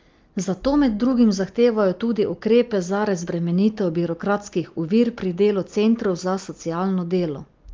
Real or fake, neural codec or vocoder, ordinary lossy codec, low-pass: real; none; Opus, 32 kbps; 7.2 kHz